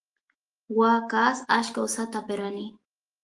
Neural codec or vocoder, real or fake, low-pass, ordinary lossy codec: autoencoder, 48 kHz, 128 numbers a frame, DAC-VAE, trained on Japanese speech; fake; 10.8 kHz; Opus, 24 kbps